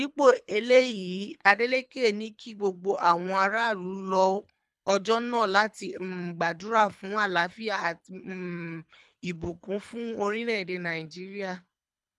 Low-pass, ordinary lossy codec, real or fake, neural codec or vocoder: none; none; fake; codec, 24 kHz, 3 kbps, HILCodec